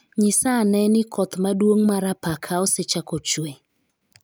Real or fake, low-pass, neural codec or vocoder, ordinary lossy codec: real; none; none; none